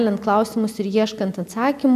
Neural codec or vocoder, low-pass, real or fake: none; 14.4 kHz; real